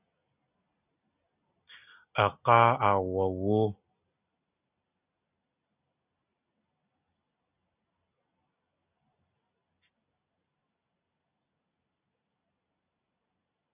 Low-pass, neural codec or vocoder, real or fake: 3.6 kHz; none; real